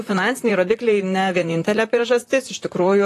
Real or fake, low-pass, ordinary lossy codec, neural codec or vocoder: fake; 14.4 kHz; AAC, 48 kbps; vocoder, 44.1 kHz, 128 mel bands, Pupu-Vocoder